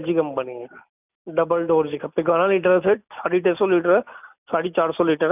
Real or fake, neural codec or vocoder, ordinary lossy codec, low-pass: real; none; none; 3.6 kHz